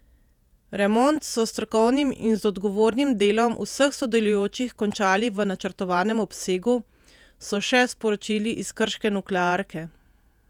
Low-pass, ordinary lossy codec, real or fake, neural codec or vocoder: 19.8 kHz; none; fake; vocoder, 48 kHz, 128 mel bands, Vocos